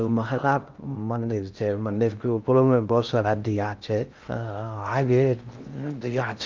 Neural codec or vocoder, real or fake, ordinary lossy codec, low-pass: codec, 16 kHz in and 24 kHz out, 0.6 kbps, FocalCodec, streaming, 2048 codes; fake; Opus, 24 kbps; 7.2 kHz